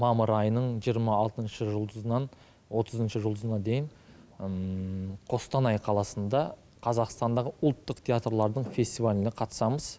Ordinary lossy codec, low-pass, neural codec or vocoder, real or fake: none; none; none; real